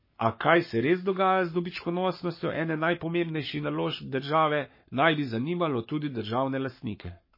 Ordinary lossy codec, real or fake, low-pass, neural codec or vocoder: MP3, 24 kbps; fake; 5.4 kHz; codec, 44.1 kHz, 7.8 kbps, Pupu-Codec